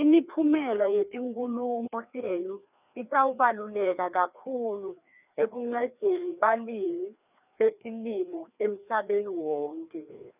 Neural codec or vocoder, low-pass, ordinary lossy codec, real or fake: codec, 16 kHz, 2 kbps, FreqCodec, larger model; 3.6 kHz; none; fake